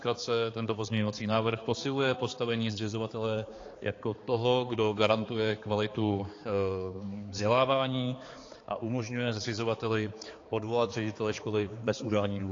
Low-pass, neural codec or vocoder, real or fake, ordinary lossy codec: 7.2 kHz; codec, 16 kHz, 4 kbps, X-Codec, HuBERT features, trained on balanced general audio; fake; AAC, 32 kbps